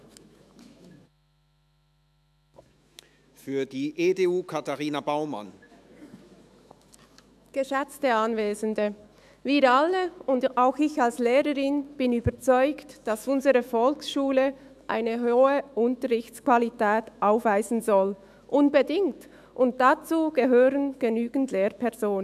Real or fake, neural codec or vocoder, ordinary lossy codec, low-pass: fake; autoencoder, 48 kHz, 128 numbers a frame, DAC-VAE, trained on Japanese speech; none; 14.4 kHz